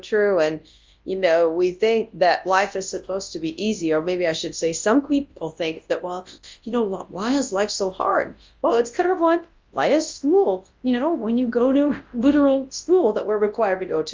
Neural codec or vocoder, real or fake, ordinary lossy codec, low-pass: codec, 24 kHz, 0.9 kbps, WavTokenizer, large speech release; fake; Opus, 32 kbps; 7.2 kHz